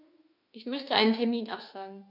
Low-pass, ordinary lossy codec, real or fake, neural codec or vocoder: 5.4 kHz; none; fake; autoencoder, 48 kHz, 32 numbers a frame, DAC-VAE, trained on Japanese speech